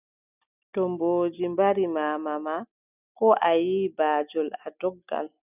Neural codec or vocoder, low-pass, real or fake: none; 3.6 kHz; real